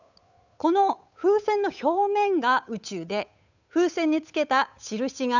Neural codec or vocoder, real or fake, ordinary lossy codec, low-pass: codec, 16 kHz, 8 kbps, FunCodec, trained on Chinese and English, 25 frames a second; fake; none; 7.2 kHz